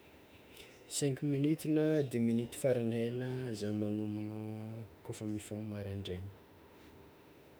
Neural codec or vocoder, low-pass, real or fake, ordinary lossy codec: autoencoder, 48 kHz, 32 numbers a frame, DAC-VAE, trained on Japanese speech; none; fake; none